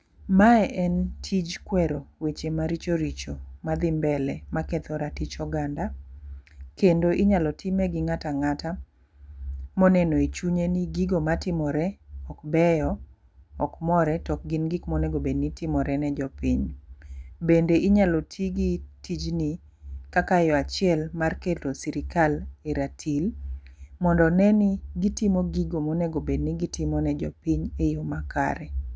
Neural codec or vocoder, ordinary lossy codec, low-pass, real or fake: none; none; none; real